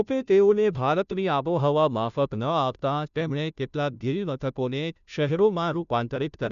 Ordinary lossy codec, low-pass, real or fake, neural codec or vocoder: none; 7.2 kHz; fake; codec, 16 kHz, 0.5 kbps, FunCodec, trained on Chinese and English, 25 frames a second